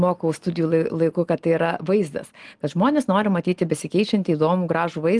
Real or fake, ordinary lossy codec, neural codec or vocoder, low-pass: real; Opus, 24 kbps; none; 10.8 kHz